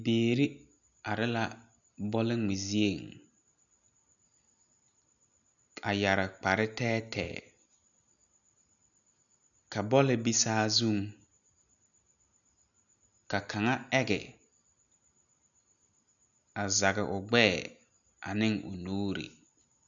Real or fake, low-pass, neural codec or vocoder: real; 7.2 kHz; none